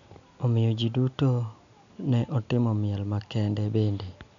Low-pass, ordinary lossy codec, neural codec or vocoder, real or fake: 7.2 kHz; none; none; real